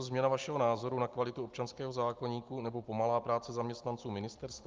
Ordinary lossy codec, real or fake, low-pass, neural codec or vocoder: Opus, 32 kbps; real; 7.2 kHz; none